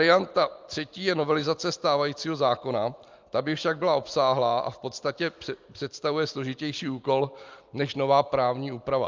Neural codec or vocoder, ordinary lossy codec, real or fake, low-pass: none; Opus, 24 kbps; real; 7.2 kHz